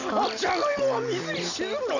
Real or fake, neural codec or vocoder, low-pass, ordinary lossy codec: real; none; 7.2 kHz; none